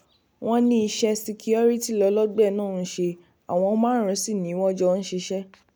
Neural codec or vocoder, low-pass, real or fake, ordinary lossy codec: none; none; real; none